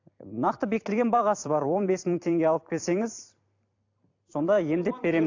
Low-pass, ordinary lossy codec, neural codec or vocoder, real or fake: 7.2 kHz; AAC, 48 kbps; vocoder, 44.1 kHz, 128 mel bands every 256 samples, BigVGAN v2; fake